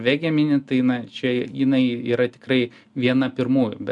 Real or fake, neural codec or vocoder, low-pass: real; none; 10.8 kHz